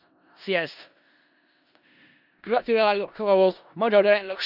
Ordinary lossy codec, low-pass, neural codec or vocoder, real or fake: none; 5.4 kHz; codec, 16 kHz in and 24 kHz out, 0.4 kbps, LongCat-Audio-Codec, four codebook decoder; fake